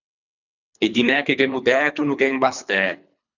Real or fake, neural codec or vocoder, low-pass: fake; codec, 24 kHz, 3 kbps, HILCodec; 7.2 kHz